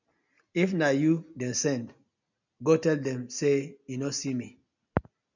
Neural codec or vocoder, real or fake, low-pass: none; real; 7.2 kHz